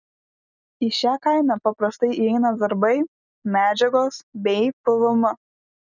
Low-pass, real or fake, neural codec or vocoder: 7.2 kHz; real; none